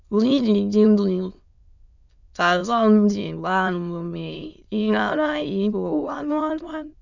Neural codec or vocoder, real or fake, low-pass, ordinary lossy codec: autoencoder, 22.05 kHz, a latent of 192 numbers a frame, VITS, trained on many speakers; fake; 7.2 kHz; none